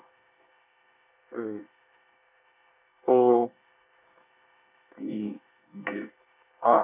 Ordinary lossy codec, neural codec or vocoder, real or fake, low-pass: none; codec, 24 kHz, 1 kbps, SNAC; fake; 3.6 kHz